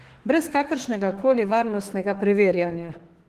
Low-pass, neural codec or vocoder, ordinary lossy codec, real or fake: 14.4 kHz; autoencoder, 48 kHz, 32 numbers a frame, DAC-VAE, trained on Japanese speech; Opus, 16 kbps; fake